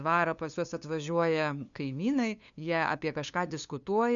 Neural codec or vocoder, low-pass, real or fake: codec, 16 kHz, 2 kbps, FunCodec, trained on LibriTTS, 25 frames a second; 7.2 kHz; fake